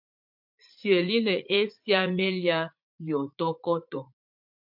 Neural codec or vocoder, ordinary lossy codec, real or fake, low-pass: vocoder, 44.1 kHz, 80 mel bands, Vocos; MP3, 48 kbps; fake; 5.4 kHz